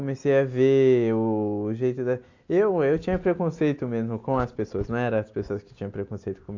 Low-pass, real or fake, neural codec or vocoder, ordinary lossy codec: 7.2 kHz; real; none; AAC, 48 kbps